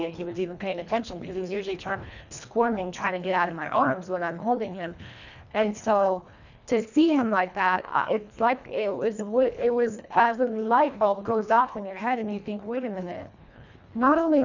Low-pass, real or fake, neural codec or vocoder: 7.2 kHz; fake; codec, 24 kHz, 1.5 kbps, HILCodec